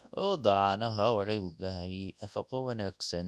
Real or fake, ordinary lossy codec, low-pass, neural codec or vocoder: fake; none; none; codec, 24 kHz, 0.9 kbps, WavTokenizer, large speech release